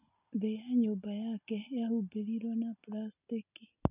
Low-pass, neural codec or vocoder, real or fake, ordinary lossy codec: 3.6 kHz; none; real; none